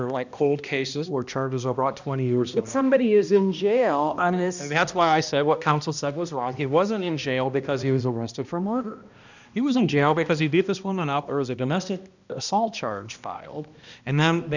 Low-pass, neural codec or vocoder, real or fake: 7.2 kHz; codec, 16 kHz, 1 kbps, X-Codec, HuBERT features, trained on balanced general audio; fake